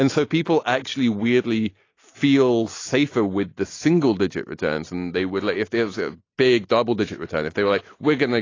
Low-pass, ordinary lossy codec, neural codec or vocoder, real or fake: 7.2 kHz; AAC, 32 kbps; none; real